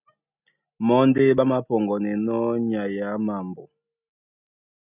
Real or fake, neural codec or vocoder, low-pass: real; none; 3.6 kHz